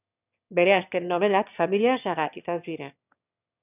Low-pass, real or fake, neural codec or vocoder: 3.6 kHz; fake; autoencoder, 22.05 kHz, a latent of 192 numbers a frame, VITS, trained on one speaker